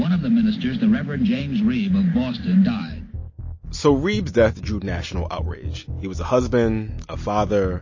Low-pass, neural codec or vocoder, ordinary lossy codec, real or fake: 7.2 kHz; none; MP3, 32 kbps; real